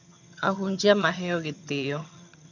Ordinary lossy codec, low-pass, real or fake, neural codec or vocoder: none; 7.2 kHz; fake; vocoder, 22.05 kHz, 80 mel bands, HiFi-GAN